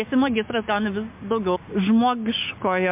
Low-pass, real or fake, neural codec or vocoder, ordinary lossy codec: 3.6 kHz; fake; codec, 16 kHz, 6 kbps, DAC; MP3, 32 kbps